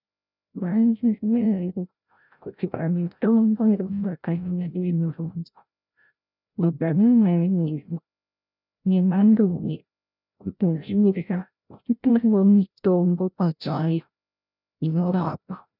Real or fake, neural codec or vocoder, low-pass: fake; codec, 16 kHz, 0.5 kbps, FreqCodec, larger model; 5.4 kHz